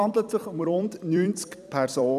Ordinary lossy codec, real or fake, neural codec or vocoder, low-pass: none; fake; vocoder, 44.1 kHz, 128 mel bands every 256 samples, BigVGAN v2; 14.4 kHz